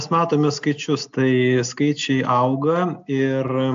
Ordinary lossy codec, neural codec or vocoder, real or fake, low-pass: AAC, 64 kbps; none; real; 7.2 kHz